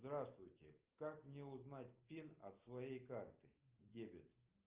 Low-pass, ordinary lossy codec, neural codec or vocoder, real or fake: 3.6 kHz; Opus, 24 kbps; none; real